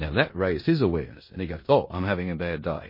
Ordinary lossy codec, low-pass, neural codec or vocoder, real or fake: MP3, 24 kbps; 5.4 kHz; codec, 16 kHz in and 24 kHz out, 0.9 kbps, LongCat-Audio-Codec, four codebook decoder; fake